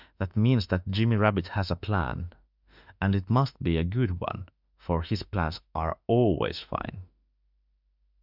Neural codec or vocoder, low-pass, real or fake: autoencoder, 48 kHz, 32 numbers a frame, DAC-VAE, trained on Japanese speech; 5.4 kHz; fake